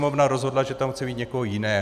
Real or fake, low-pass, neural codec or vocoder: real; 14.4 kHz; none